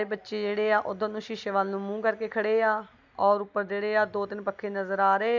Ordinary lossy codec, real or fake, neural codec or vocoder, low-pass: none; real; none; 7.2 kHz